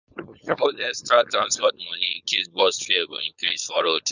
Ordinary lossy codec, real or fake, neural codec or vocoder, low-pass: none; fake; codec, 16 kHz, 4.8 kbps, FACodec; 7.2 kHz